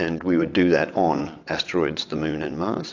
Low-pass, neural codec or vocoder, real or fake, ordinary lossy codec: 7.2 kHz; vocoder, 22.05 kHz, 80 mel bands, WaveNeXt; fake; AAC, 48 kbps